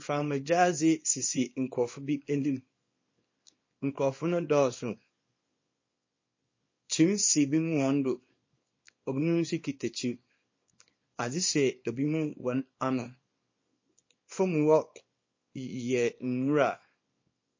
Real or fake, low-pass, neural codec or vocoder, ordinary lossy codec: fake; 7.2 kHz; codec, 24 kHz, 0.9 kbps, WavTokenizer, small release; MP3, 32 kbps